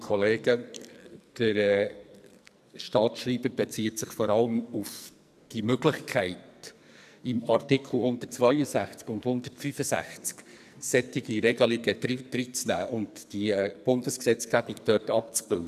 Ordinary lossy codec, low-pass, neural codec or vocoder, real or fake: none; 14.4 kHz; codec, 44.1 kHz, 2.6 kbps, SNAC; fake